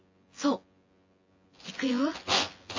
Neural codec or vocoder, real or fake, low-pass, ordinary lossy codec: vocoder, 24 kHz, 100 mel bands, Vocos; fake; 7.2 kHz; MP3, 32 kbps